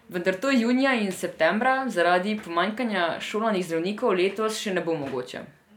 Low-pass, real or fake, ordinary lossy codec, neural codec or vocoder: 19.8 kHz; real; none; none